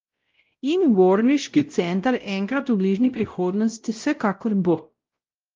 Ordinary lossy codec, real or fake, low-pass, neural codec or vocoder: Opus, 32 kbps; fake; 7.2 kHz; codec, 16 kHz, 0.5 kbps, X-Codec, WavLM features, trained on Multilingual LibriSpeech